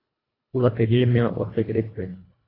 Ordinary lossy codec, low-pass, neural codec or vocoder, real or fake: AAC, 24 kbps; 5.4 kHz; codec, 24 kHz, 1.5 kbps, HILCodec; fake